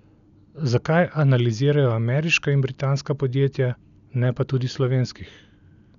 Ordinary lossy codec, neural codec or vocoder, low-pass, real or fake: none; none; 7.2 kHz; real